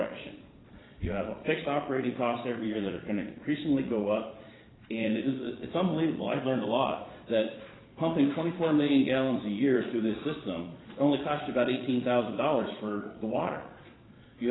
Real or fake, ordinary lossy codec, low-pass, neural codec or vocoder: fake; AAC, 16 kbps; 7.2 kHz; vocoder, 22.05 kHz, 80 mel bands, Vocos